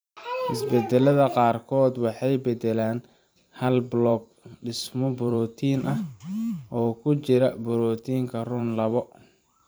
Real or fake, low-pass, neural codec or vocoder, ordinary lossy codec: fake; none; vocoder, 44.1 kHz, 128 mel bands every 512 samples, BigVGAN v2; none